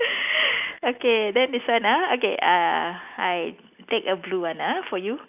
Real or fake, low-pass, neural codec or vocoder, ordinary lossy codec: real; 3.6 kHz; none; none